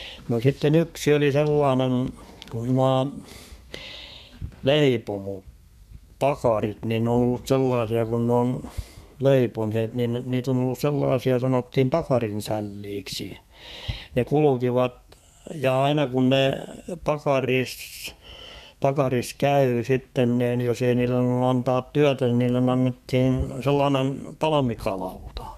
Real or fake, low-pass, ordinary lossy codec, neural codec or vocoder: fake; 14.4 kHz; none; codec, 32 kHz, 1.9 kbps, SNAC